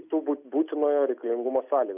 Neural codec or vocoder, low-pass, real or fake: none; 3.6 kHz; real